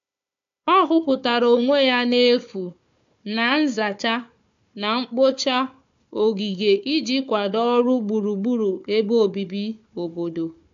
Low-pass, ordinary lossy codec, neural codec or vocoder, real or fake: 7.2 kHz; AAC, 64 kbps; codec, 16 kHz, 4 kbps, FunCodec, trained on Chinese and English, 50 frames a second; fake